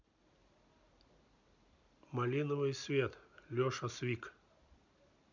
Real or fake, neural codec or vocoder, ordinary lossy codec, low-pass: real; none; none; 7.2 kHz